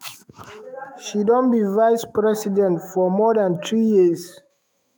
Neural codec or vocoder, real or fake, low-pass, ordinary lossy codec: autoencoder, 48 kHz, 128 numbers a frame, DAC-VAE, trained on Japanese speech; fake; none; none